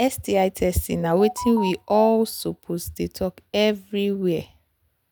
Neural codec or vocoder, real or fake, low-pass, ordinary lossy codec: none; real; none; none